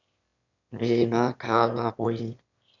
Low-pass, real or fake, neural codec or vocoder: 7.2 kHz; fake; autoencoder, 22.05 kHz, a latent of 192 numbers a frame, VITS, trained on one speaker